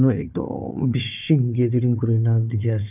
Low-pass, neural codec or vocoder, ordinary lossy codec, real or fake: 3.6 kHz; codec, 16 kHz, 4 kbps, FunCodec, trained on Chinese and English, 50 frames a second; none; fake